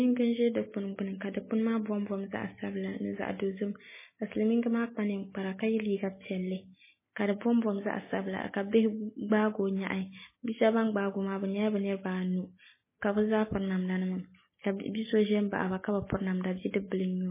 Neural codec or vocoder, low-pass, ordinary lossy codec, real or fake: none; 3.6 kHz; MP3, 16 kbps; real